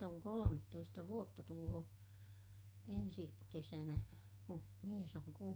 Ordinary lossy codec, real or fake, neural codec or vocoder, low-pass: none; fake; codec, 44.1 kHz, 2.6 kbps, SNAC; none